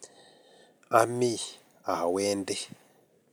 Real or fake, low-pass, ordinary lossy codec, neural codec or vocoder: real; none; none; none